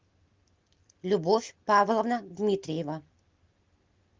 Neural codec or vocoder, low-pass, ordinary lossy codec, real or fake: none; 7.2 kHz; Opus, 32 kbps; real